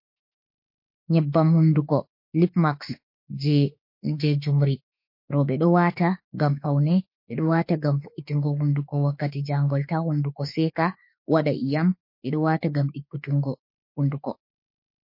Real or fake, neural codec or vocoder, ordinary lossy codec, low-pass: fake; autoencoder, 48 kHz, 32 numbers a frame, DAC-VAE, trained on Japanese speech; MP3, 32 kbps; 5.4 kHz